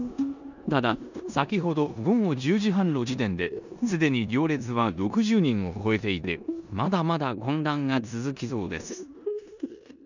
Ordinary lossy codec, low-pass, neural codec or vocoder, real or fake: none; 7.2 kHz; codec, 16 kHz in and 24 kHz out, 0.9 kbps, LongCat-Audio-Codec, four codebook decoder; fake